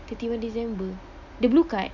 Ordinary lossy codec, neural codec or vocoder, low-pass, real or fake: none; none; 7.2 kHz; real